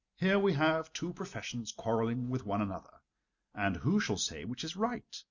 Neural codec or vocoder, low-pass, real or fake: none; 7.2 kHz; real